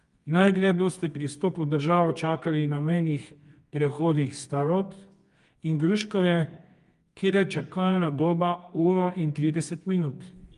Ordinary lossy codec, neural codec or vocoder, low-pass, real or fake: Opus, 32 kbps; codec, 24 kHz, 0.9 kbps, WavTokenizer, medium music audio release; 10.8 kHz; fake